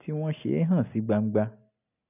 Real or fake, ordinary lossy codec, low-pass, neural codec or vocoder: real; none; 3.6 kHz; none